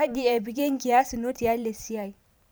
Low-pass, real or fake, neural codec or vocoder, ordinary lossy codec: none; fake; vocoder, 44.1 kHz, 128 mel bands every 512 samples, BigVGAN v2; none